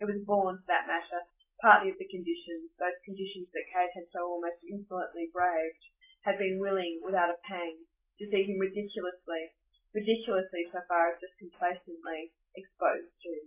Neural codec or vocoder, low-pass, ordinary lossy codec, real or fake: none; 3.6 kHz; AAC, 24 kbps; real